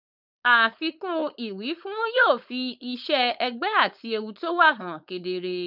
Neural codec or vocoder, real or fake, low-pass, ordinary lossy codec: codec, 16 kHz, 4.8 kbps, FACodec; fake; 5.4 kHz; none